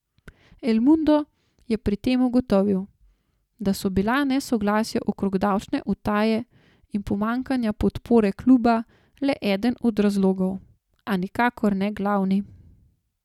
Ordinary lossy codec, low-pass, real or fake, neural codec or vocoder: none; 19.8 kHz; real; none